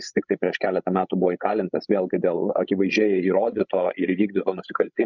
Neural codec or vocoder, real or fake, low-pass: codec, 16 kHz, 16 kbps, FreqCodec, larger model; fake; 7.2 kHz